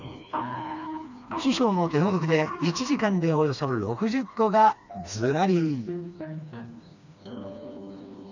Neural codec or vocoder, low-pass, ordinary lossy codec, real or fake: codec, 16 kHz, 2 kbps, FreqCodec, smaller model; 7.2 kHz; none; fake